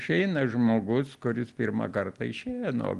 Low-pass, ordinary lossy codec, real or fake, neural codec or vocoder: 10.8 kHz; Opus, 24 kbps; real; none